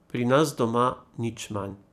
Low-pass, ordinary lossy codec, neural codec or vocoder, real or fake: 14.4 kHz; none; vocoder, 44.1 kHz, 128 mel bands every 256 samples, BigVGAN v2; fake